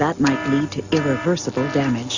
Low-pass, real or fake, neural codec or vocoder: 7.2 kHz; real; none